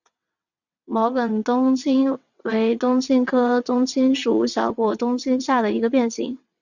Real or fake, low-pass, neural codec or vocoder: fake; 7.2 kHz; vocoder, 22.05 kHz, 80 mel bands, WaveNeXt